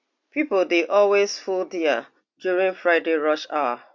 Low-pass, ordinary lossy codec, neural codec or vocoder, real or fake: 7.2 kHz; MP3, 48 kbps; none; real